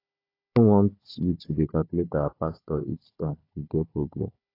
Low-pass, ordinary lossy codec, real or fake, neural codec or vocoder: 5.4 kHz; AAC, 32 kbps; fake; codec, 16 kHz, 4 kbps, FunCodec, trained on Chinese and English, 50 frames a second